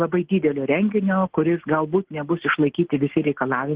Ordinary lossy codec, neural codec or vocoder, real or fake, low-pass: Opus, 16 kbps; none; real; 3.6 kHz